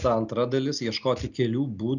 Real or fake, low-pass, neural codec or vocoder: real; 7.2 kHz; none